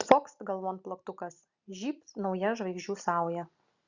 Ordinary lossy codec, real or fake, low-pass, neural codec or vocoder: Opus, 64 kbps; real; 7.2 kHz; none